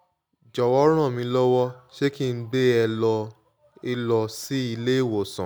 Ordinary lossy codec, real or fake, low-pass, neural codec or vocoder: none; real; none; none